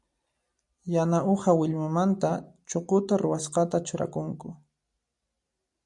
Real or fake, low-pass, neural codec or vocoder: real; 10.8 kHz; none